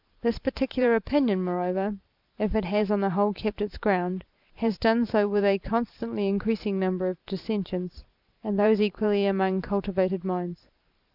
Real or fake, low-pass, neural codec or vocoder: fake; 5.4 kHz; vocoder, 44.1 kHz, 128 mel bands every 512 samples, BigVGAN v2